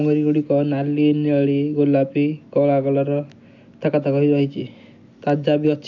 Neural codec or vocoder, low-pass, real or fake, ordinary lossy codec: none; 7.2 kHz; real; MP3, 64 kbps